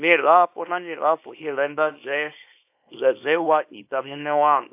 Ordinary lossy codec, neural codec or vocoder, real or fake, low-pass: AAC, 32 kbps; codec, 24 kHz, 0.9 kbps, WavTokenizer, small release; fake; 3.6 kHz